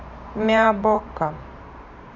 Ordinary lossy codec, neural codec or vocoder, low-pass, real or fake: none; vocoder, 44.1 kHz, 128 mel bands every 512 samples, BigVGAN v2; 7.2 kHz; fake